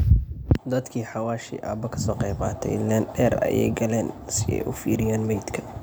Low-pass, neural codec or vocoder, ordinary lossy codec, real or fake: none; none; none; real